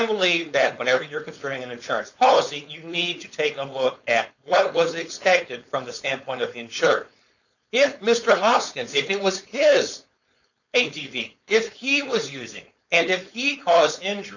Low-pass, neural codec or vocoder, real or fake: 7.2 kHz; codec, 16 kHz, 4.8 kbps, FACodec; fake